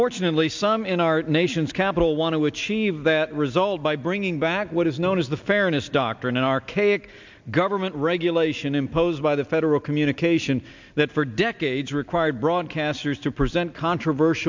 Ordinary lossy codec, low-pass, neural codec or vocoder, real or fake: MP3, 64 kbps; 7.2 kHz; none; real